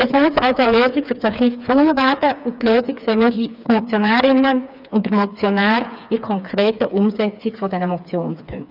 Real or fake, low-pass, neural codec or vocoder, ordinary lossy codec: fake; 5.4 kHz; codec, 16 kHz, 4 kbps, FreqCodec, smaller model; none